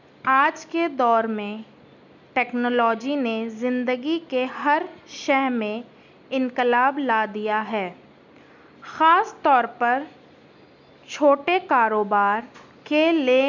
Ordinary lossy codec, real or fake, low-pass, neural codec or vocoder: none; real; 7.2 kHz; none